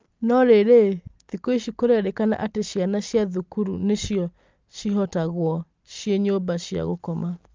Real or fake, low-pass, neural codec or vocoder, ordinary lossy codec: real; 7.2 kHz; none; Opus, 24 kbps